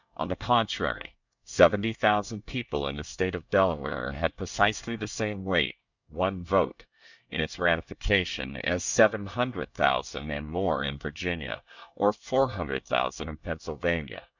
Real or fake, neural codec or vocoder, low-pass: fake; codec, 24 kHz, 1 kbps, SNAC; 7.2 kHz